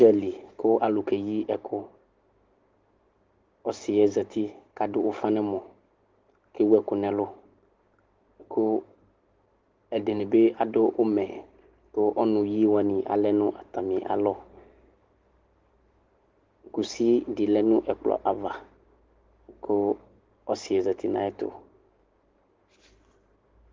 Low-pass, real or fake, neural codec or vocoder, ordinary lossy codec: 7.2 kHz; real; none; Opus, 16 kbps